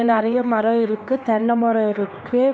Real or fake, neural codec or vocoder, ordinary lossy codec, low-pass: fake; codec, 16 kHz, 2 kbps, X-Codec, HuBERT features, trained on LibriSpeech; none; none